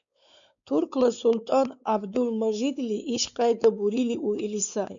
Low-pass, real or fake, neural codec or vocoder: 7.2 kHz; fake; codec, 16 kHz, 4 kbps, X-Codec, WavLM features, trained on Multilingual LibriSpeech